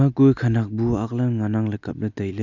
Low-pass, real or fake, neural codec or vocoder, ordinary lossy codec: 7.2 kHz; real; none; none